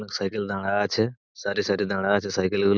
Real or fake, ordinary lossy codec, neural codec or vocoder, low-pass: real; Opus, 64 kbps; none; 7.2 kHz